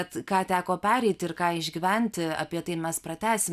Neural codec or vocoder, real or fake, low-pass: none; real; 14.4 kHz